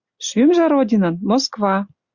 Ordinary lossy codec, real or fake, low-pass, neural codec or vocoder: Opus, 64 kbps; real; 7.2 kHz; none